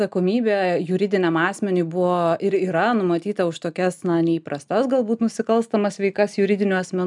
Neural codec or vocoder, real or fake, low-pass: none; real; 10.8 kHz